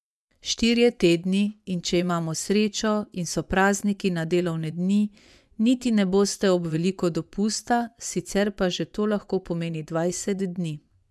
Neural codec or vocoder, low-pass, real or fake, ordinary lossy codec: vocoder, 24 kHz, 100 mel bands, Vocos; none; fake; none